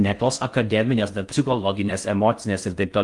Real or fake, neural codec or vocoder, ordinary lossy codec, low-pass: fake; codec, 16 kHz in and 24 kHz out, 0.6 kbps, FocalCodec, streaming, 4096 codes; Opus, 32 kbps; 10.8 kHz